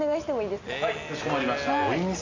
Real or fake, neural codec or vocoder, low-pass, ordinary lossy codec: real; none; 7.2 kHz; AAC, 48 kbps